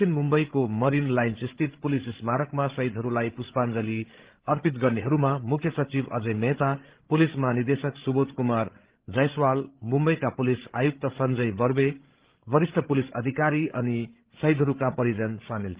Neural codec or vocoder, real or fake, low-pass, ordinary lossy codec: codec, 16 kHz, 16 kbps, FreqCodec, larger model; fake; 3.6 kHz; Opus, 16 kbps